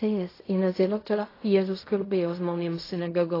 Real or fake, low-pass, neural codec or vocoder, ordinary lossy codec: fake; 5.4 kHz; codec, 16 kHz in and 24 kHz out, 0.4 kbps, LongCat-Audio-Codec, fine tuned four codebook decoder; AAC, 24 kbps